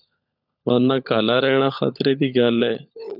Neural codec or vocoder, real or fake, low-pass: codec, 16 kHz, 16 kbps, FunCodec, trained on LibriTTS, 50 frames a second; fake; 5.4 kHz